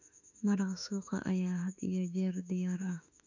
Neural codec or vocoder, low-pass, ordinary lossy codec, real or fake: autoencoder, 48 kHz, 32 numbers a frame, DAC-VAE, trained on Japanese speech; 7.2 kHz; none; fake